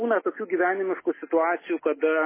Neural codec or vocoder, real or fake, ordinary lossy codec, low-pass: none; real; MP3, 16 kbps; 3.6 kHz